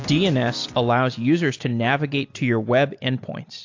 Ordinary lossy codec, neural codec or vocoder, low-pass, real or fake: AAC, 48 kbps; none; 7.2 kHz; real